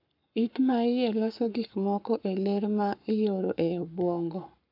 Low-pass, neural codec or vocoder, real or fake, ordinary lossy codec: 5.4 kHz; codec, 44.1 kHz, 7.8 kbps, Pupu-Codec; fake; none